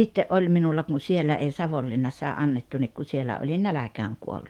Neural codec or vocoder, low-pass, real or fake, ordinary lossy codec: codec, 44.1 kHz, 7.8 kbps, DAC; 19.8 kHz; fake; Opus, 24 kbps